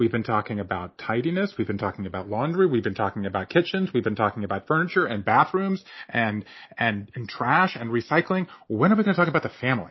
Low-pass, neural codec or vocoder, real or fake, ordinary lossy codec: 7.2 kHz; none; real; MP3, 24 kbps